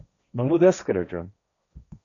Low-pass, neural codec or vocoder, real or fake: 7.2 kHz; codec, 16 kHz, 1.1 kbps, Voila-Tokenizer; fake